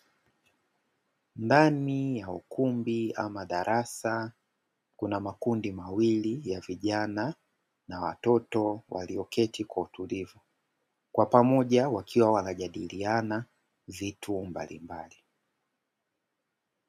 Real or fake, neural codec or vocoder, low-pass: real; none; 14.4 kHz